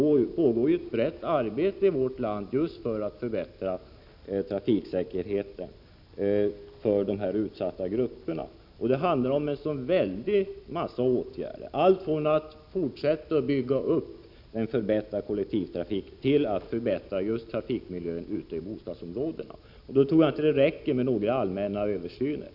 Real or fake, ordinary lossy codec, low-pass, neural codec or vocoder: real; none; 5.4 kHz; none